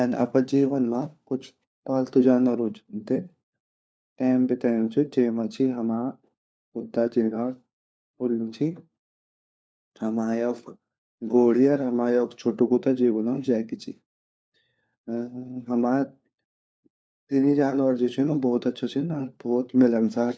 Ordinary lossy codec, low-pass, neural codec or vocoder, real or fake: none; none; codec, 16 kHz, 4 kbps, FunCodec, trained on LibriTTS, 50 frames a second; fake